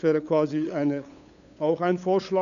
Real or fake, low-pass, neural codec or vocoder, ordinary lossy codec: fake; 7.2 kHz; codec, 16 kHz, 8 kbps, FunCodec, trained on LibriTTS, 25 frames a second; none